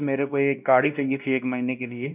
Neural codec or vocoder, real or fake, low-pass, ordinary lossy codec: codec, 16 kHz, 1 kbps, X-Codec, WavLM features, trained on Multilingual LibriSpeech; fake; 3.6 kHz; none